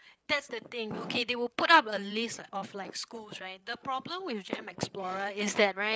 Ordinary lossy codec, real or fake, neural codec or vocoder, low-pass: none; fake; codec, 16 kHz, 8 kbps, FreqCodec, larger model; none